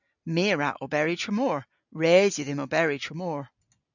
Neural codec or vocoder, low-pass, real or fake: none; 7.2 kHz; real